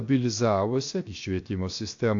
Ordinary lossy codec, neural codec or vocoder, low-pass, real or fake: AAC, 48 kbps; codec, 16 kHz, 0.7 kbps, FocalCodec; 7.2 kHz; fake